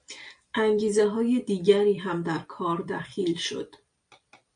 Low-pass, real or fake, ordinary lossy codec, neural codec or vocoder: 9.9 kHz; real; MP3, 64 kbps; none